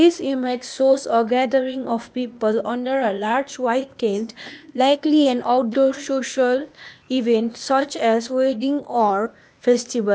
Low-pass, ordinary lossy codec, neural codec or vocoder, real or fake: none; none; codec, 16 kHz, 0.8 kbps, ZipCodec; fake